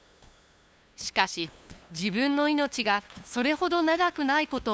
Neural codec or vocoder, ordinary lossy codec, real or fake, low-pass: codec, 16 kHz, 2 kbps, FunCodec, trained on LibriTTS, 25 frames a second; none; fake; none